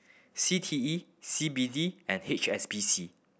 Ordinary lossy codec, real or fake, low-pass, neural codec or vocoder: none; real; none; none